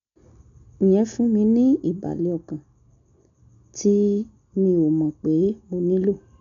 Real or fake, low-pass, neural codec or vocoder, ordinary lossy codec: real; 7.2 kHz; none; none